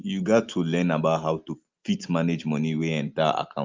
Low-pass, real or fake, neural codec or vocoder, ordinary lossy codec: 7.2 kHz; real; none; Opus, 24 kbps